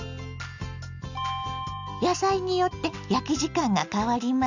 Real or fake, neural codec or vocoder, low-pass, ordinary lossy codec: real; none; 7.2 kHz; none